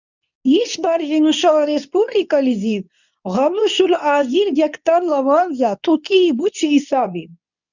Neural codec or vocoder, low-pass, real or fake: codec, 24 kHz, 0.9 kbps, WavTokenizer, medium speech release version 2; 7.2 kHz; fake